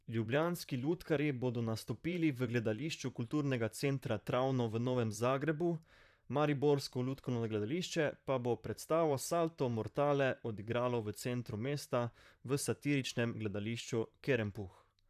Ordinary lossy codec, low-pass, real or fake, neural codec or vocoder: none; 14.4 kHz; fake; vocoder, 44.1 kHz, 128 mel bands, Pupu-Vocoder